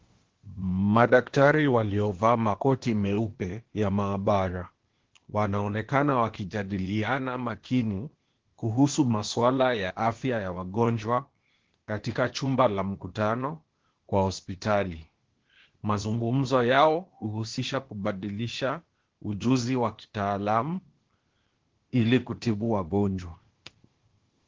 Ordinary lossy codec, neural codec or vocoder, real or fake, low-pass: Opus, 16 kbps; codec, 16 kHz, 0.8 kbps, ZipCodec; fake; 7.2 kHz